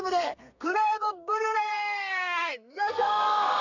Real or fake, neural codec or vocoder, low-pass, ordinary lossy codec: fake; codec, 32 kHz, 1.9 kbps, SNAC; 7.2 kHz; none